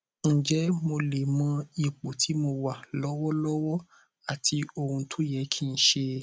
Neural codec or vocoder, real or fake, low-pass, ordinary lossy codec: none; real; none; none